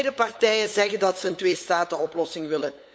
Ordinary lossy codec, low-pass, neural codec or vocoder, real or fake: none; none; codec, 16 kHz, 8 kbps, FunCodec, trained on LibriTTS, 25 frames a second; fake